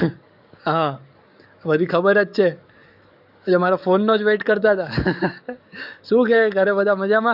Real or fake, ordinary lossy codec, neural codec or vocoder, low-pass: fake; none; codec, 44.1 kHz, 7.8 kbps, DAC; 5.4 kHz